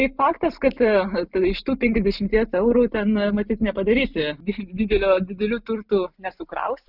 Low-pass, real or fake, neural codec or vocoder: 5.4 kHz; real; none